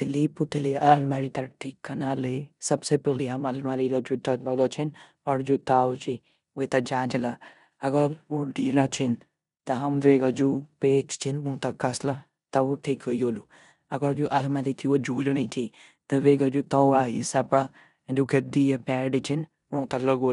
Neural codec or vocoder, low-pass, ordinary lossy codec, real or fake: codec, 16 kHz in and 24 kHz out, 0.9 kbps, LongCat-Audio-Codec, four codebook decoder; 10.8 kHz; none; fake